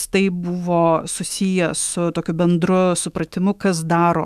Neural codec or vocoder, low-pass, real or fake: autoencoder, 48 kHz, 128 numbers a frame, DAC-VAE, trained on Japanese speech; 14.4 kHz; fake